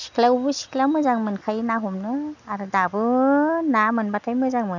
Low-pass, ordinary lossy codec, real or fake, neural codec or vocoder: 7.2 kHz; none; real; none